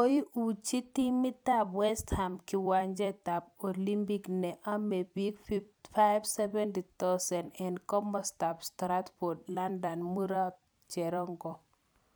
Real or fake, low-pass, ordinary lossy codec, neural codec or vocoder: fake; none; none; vocoder, 44.1 kHz, 128 mel bands every 256 samples, BigVGAN v2